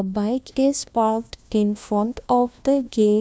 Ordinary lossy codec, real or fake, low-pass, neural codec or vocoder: none; fake; none; codec, 16 kHz, 1 kbps, FunCodec, trained on LibriTTS, 50 frames a second